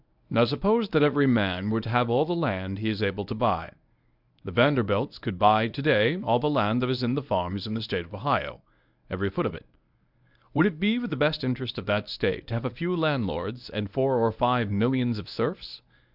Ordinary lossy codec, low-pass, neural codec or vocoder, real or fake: Opus, 64 kbps; 5.4 kHz; codec, 24 kHz, 0.9 kbps, WavTokenizer, medium speech release version 1; fake